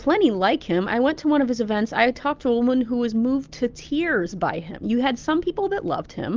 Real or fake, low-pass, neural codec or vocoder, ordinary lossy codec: real; 7.2 kHz; none; Opus, 24 kbps